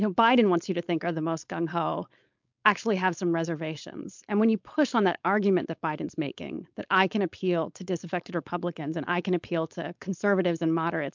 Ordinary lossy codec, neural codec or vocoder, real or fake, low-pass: MP3, 64 kbps; codec, 16 kHz, 4.8 kbps, FACodec; fake; 7.2 kHz